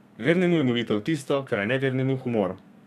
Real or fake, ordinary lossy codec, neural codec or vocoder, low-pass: fake; none; codec, 32 kHz, 1.9 kbps, SNAC; 14.4 kHz